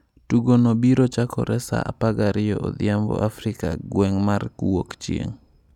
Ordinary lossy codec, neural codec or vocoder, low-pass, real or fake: none; none; 19.8 kHz; real